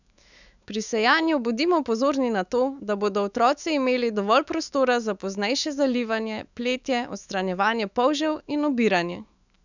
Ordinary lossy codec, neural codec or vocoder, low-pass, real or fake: none; autoencoder, 48 kHz, 128 numbers a frame, DAC-VAE, trained on Japanese speech; 7.2 kHz; fake